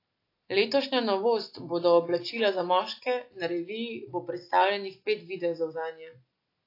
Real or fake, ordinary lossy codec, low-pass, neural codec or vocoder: real; AAC, 32 kbps; 5.4 kHz; none